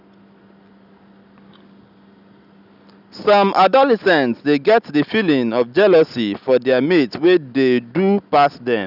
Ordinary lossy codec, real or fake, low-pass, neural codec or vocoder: none; real; 5.4 kHz; none